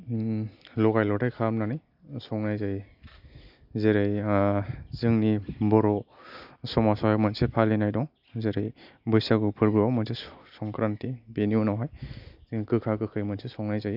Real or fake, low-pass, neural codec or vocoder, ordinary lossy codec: real; 5.4 kHz; none; none